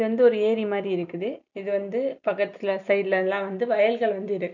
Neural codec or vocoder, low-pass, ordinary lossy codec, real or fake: none; 7.2 kHz; none; real